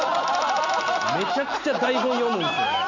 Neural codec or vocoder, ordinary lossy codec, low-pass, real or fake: none; none; 7.2 kHz; real